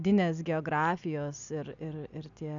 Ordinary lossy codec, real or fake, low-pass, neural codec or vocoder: MP3, 96 kbps; real; 7.2 kHz; none